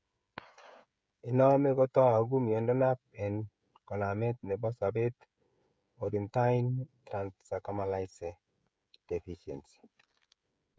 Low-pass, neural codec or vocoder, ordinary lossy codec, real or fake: none; codec, 16 kHz, 16 kbps, FreqCodec, smaller model; none; fake